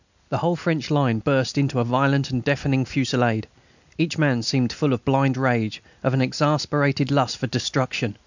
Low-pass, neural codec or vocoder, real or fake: 7.2 kHz; none; real